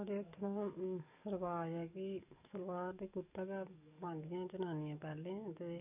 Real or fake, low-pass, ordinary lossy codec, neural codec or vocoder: fake; 3.6 kHz; none; codec, 44.1 kHz, 7.8 kbps, DAC